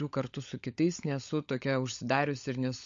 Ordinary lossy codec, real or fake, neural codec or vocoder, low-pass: MP3, 48 kbps; fake; codec, 16 kHz, 16 kbps, FunCodec, trained on LibriTTS, 50 frames a second; 7.2 kHz